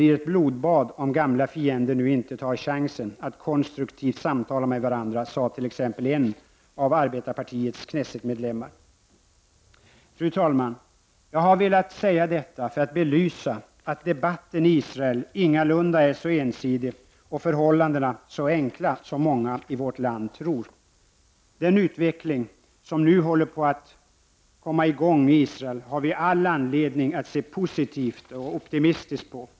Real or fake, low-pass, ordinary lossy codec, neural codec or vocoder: real; none; none; none